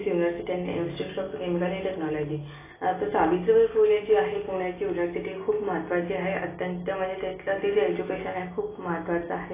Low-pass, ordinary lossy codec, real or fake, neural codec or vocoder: 3.6 kHz; AAC, 16 kbps; real; none